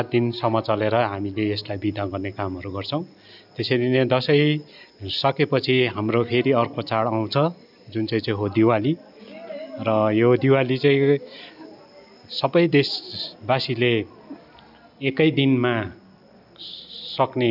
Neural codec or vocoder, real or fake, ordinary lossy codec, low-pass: none; real; none; 5.4 kHz